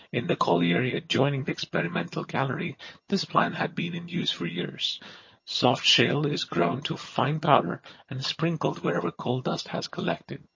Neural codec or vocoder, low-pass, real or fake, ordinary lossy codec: vocoder, 22.05 kHz, 80 mel bands, HiFi-GAN; 7.2 kHz; fake; MP3, 32 kbps